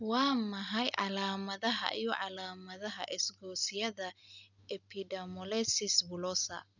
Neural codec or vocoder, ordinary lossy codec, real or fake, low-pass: none; none; real; 7.2 kHz